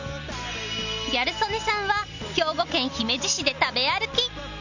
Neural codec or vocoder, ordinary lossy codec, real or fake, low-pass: none; none; real; 7.2 kHz